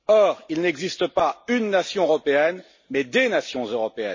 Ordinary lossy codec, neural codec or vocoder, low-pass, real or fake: MP3, 32 kbps; none; 7.2 kHz; real